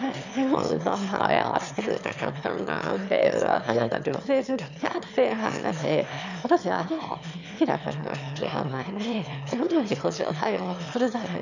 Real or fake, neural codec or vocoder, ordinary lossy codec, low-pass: fake; autoencoder, 22.05 kHz, a latent of 192 numbers a frame, VITS, trained on one speaker; none; 7.2 kHz